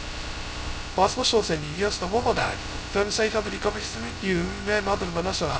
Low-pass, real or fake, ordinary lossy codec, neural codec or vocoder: none; fake; none; codec, 16 kHz, 0.2 kbps, FocalCodec